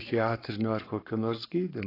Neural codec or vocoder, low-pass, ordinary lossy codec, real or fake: codec, 44.1 kHz, 7.8 kbps, Pupu-Codec; 5.4 kHz; AAC, 24 kbps; fake